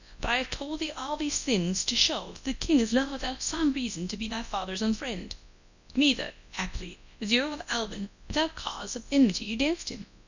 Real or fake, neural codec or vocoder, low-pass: fake; codec, 24 kHz, 0.9 kbps, WavTokenizer, large speech release; 7.2 kHz